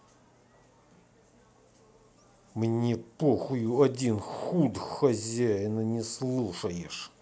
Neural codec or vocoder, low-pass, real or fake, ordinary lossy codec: none; none; real; none